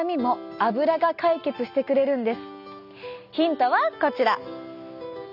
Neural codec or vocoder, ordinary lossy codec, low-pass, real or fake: none; none; 5.4 kHz; real